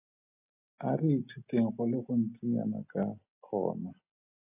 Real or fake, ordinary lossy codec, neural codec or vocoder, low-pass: real; AAC, 32 kbps; none; 3.6 kHz